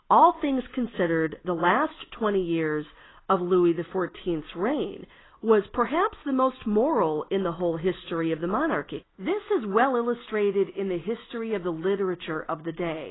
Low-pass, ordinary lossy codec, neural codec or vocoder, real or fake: 7.2 kHz; AAC, 16 kbps; none; real